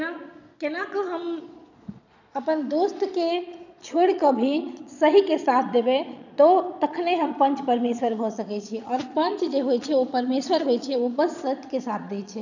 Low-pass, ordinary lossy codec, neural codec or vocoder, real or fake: 7.2 kHz; none; vocoder, 22.05 kHz, 80 mel bands, Vocos; fake